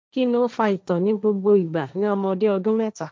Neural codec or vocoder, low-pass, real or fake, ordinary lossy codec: codec, 16 kHz, 1.1 kbps, Voila-Tokenizer; none; fake; none